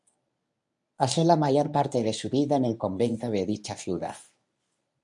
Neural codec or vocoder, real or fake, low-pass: codec, 24 kHz, 0.9 kbps, WavTokenizer, medium speech release version 1; fake; 10.8 kHz